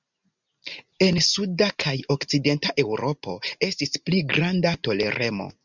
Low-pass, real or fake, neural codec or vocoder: 7.2 kHz; real; none